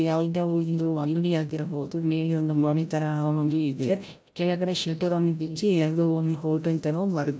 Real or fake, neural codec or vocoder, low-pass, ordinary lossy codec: fake; codec, 16 kHz, 0.5 kbps, FreqCodec, larger model; none; none